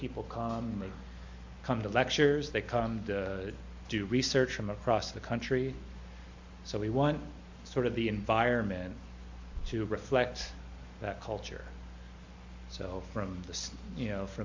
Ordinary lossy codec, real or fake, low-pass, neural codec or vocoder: MP3, 48 kbps; real; 7.2 kHz; none